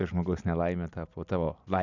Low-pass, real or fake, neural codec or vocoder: 7.2 kHz; real; none